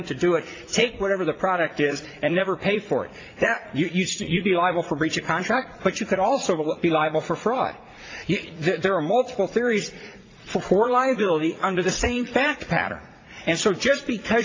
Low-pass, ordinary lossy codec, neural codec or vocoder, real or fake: 7.2 kHz; AAC, 32 kbps; vocoder, 44.1 kHz, 80 mel bands, Vocos; fake